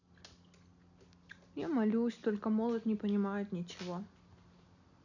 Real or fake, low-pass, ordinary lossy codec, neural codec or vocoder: real; 7.2 kHz; none; none